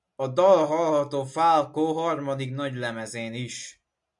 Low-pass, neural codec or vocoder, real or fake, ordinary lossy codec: 10.8 kHz; none; real; MP3, 64 kbps